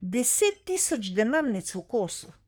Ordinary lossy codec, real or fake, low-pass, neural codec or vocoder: none; fake; none; codec, 44.1 kHz, 3.4 kbps, Pupu-Codec